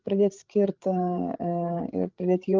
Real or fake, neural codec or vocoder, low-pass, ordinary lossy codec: fake; codec, 16 kHz, 8 kbps, FreqCodec, larger model; 7.2 kHz; Opus, 32 kbps